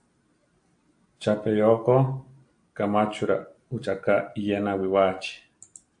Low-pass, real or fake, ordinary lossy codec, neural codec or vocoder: 9.9 kHz; real; AAC, 64 kbps; none